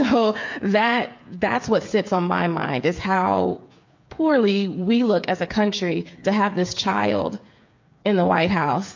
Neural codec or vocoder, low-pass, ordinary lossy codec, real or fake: codec, 16 kHz, 8 kbps, FreqCodec, smaller model; 7.2 kHz; MP3, 48 kbps; fake